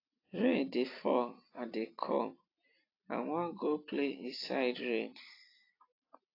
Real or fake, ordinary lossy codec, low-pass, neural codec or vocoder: real; AAC, 32 kbps; 5.4 kHz; none